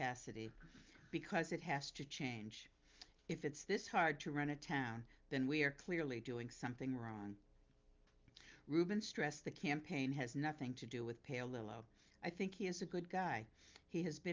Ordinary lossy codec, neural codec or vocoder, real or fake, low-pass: Opus, 24 kbps; none; real; 7.2 kHz